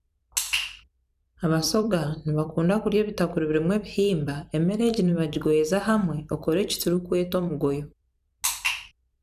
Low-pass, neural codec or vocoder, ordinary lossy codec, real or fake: 14.4 kHz; vocoder, 44.1 kHz, 128 mel bands every 256 samples, BigVGAN v2; none; fake